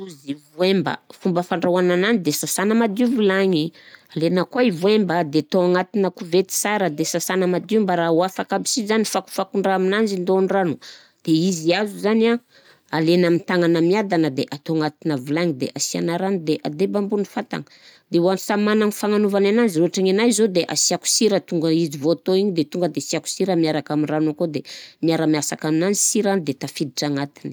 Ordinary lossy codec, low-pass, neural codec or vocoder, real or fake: none; none; none; real